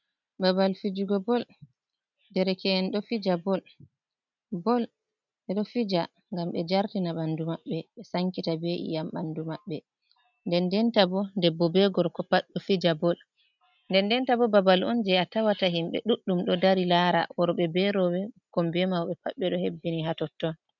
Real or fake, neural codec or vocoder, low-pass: real; none; 7.2 kHz